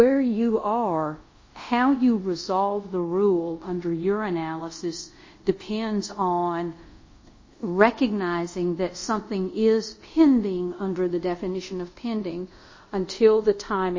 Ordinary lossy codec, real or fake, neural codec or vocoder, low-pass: MP3, 32 kbps; fake; codec, 24 kHz, 0.5 kbps, DualCodec; 7.2 kHz